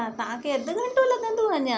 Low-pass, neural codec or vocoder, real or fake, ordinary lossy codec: none; none; real; none